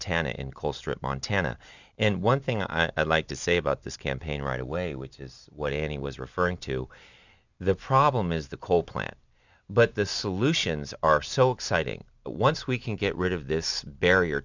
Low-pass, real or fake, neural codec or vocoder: 7.2 kHz; real; none